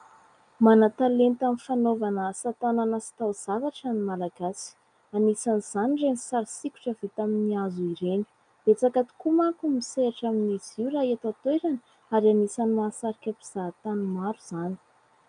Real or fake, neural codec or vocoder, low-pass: real; none; 9.9 kHz